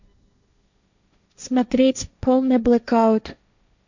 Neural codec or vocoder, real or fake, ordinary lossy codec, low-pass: codec, 16 kHz, 1.1 kbps, Voila-Tokenizer; fake; none; 7.2 kHz